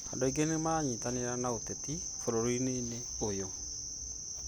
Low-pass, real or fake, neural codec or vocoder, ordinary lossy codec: none; real; none; none